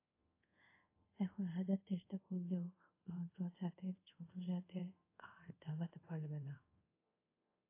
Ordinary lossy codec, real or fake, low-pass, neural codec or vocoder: AAC, 24 kbps; fake; 3.6 kHz; codec, 24 kHz, 0.5 kbps, DualCodec